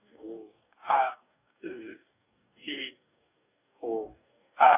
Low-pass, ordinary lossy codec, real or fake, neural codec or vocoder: 3.6 kHz; none; fake; codec, 44.1 kHz, 2.6 kbps, DAC